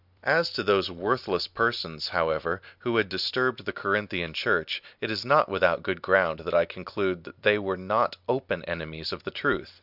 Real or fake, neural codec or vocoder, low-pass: real; none; 5.4 kHz